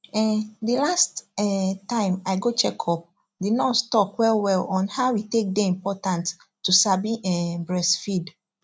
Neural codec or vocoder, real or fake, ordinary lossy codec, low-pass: none; real; none; none